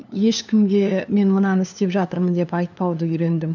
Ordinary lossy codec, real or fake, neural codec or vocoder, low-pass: none; fake; codec, 16 kHz, 2 kbps, FunCodec, trained on LibriTTS, 25 frames a second; 7.2 kHz